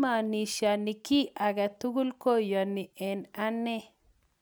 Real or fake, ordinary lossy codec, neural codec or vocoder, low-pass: real; none; none; none